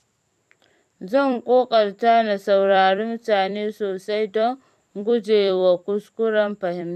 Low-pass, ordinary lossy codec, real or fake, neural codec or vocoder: 14.4 kHz; none; fake; vocoder, 44.1 kHz, 128 mel bands every 256 samples, BigVGAN v2